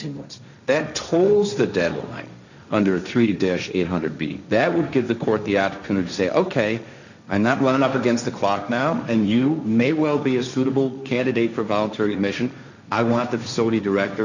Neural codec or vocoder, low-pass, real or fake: codec, 16 kHz, 1.1 kbps, Voila-Tokenizer; 7.2 kHz; fake